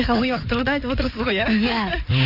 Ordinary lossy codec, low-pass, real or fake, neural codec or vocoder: none; 5.4 kHz; fake; codec, 16 kHz in and 24 kHz out, 2.2 kbps, FireRedTTS-2 codec